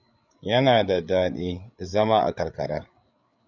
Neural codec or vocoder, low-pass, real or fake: codec, 16 kHz, 8 kbps, FreqCodec, larger model; 7.2 kHz; fake